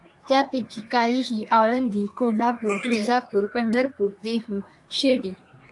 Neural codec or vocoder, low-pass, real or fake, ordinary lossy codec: codec, 24 kHz, 1 kbps, SNAC; 10.8 kHz; fake; AAC, 64 kbps